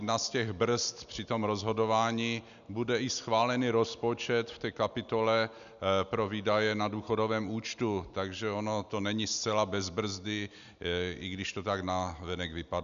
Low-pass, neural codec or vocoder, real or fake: 7.2 kHz; none; real